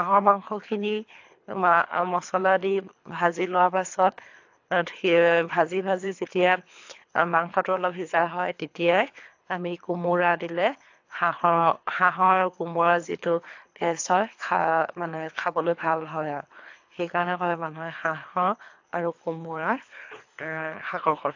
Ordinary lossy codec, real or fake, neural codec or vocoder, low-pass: AAC, 48 kbps; fake; codec, 24 kHz, 3 kbps, HILCodec; 7.2 kHz